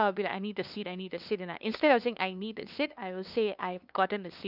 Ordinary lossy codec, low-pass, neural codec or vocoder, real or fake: none; 5.4 kHz; codec, 16 kHz, 2 kbps, FunCodec, trained on LibriTTS, 25 frames a second; fake